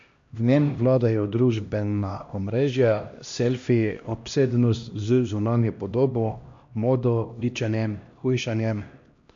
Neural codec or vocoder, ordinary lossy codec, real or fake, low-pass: codec, 16 kHz, 1 kbps, X-Codec, HuBERT features, trained on LibriSpeech; MP3, 48 kbps; fake; 7.2 kHz